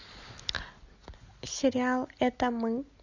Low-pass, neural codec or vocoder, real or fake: 7.2 kHz; none; real